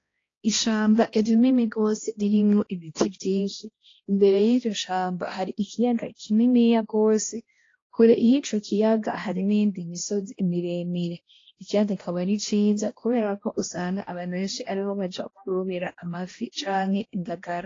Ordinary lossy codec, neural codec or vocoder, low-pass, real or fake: AAC, 32 kbps; codec, 16 kHz, 1 kbps, X-Codec, HuBERT features, trained on balanced general audio; 7.2 kHz; fake